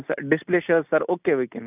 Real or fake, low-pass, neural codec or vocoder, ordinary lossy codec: real; 3.6 kHz; none; none